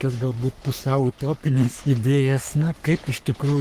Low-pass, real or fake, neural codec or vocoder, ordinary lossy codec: 14.4 kHz; fake; codec, 44.1 kHz, 3.4 kbps, Pupu-Codec; Opus, 24 kbps